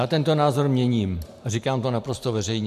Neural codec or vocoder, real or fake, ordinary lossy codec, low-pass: none; real; MP3, 96 kbps; 14.4 kHz